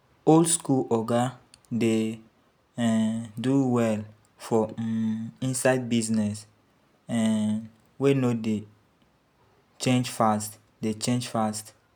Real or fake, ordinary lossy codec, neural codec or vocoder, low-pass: real; none; none; none